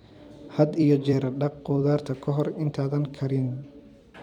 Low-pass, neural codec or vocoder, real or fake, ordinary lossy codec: 19.8 kHz; vocoder, 44.1 kHz, 128 mel bands every 256 samples, BigVGAN v2; fake; none